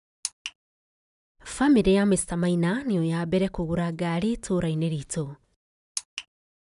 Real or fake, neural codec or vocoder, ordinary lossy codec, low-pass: real; none; none; 10.8 kHz